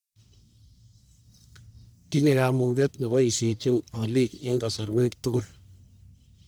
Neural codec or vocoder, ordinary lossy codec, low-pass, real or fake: codec, 44.1 kHz, 1.7 kbps, Pupu-Codec; none; none; fake